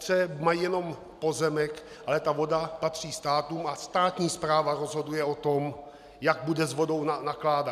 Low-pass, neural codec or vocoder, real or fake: 14.4 kHz; none; real